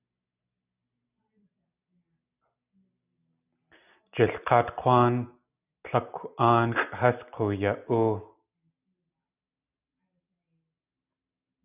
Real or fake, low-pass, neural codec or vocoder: real; 3.6 kHz; none